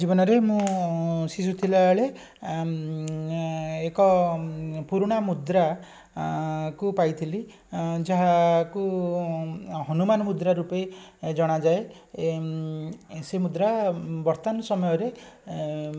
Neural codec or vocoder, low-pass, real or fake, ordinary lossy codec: none; none; real; none